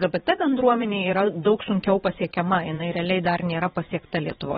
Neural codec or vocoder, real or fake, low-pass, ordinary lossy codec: vocoder, 22.05 kHz, 80 mel bands, Vocos; fake; 9.9 kHz; AAC, 16 kbps